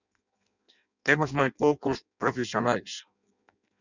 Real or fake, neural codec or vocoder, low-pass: fake; codec, 16 kHz in and 24 kHz out, 0.6 kbps, FireRedTTS-2 codec; 7.2 kHz